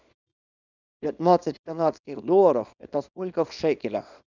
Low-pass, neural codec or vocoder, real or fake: 7.2 kHz; codec, 24 kHz, 0.9 kbps, WavTokenizer, small release; fake